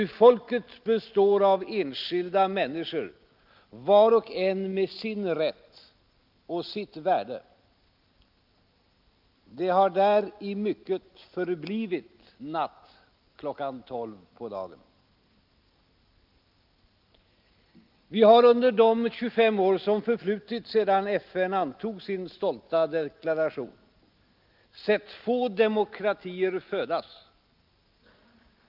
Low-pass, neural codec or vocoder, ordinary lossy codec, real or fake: 5.4 kHz; none; Opus, 24 kbps; real